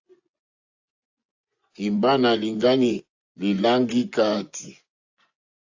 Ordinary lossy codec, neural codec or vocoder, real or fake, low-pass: AAC, 48 kbps; vocoder, 24 kHz, 100 mel bands, Vocos; fake; 7.2 kHz